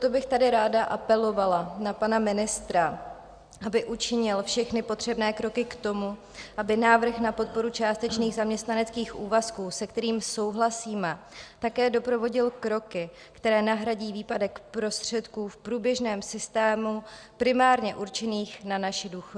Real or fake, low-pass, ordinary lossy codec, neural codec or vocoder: real; 9.9 kHz; Opus, 64 kbps; none